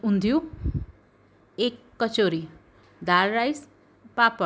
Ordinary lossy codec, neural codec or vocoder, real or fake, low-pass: none; none; real; none